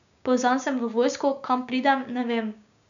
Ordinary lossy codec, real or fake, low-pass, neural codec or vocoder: none; fake; 7.2 kHz; codec, 16 kHz, 6 kbps, DAC